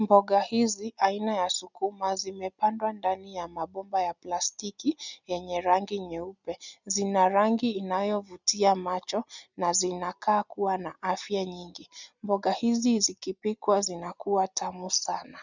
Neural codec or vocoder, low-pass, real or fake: none; 7.2 kHz; real